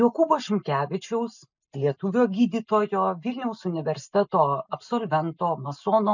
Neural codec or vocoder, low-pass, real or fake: none; 7.2 kHz; real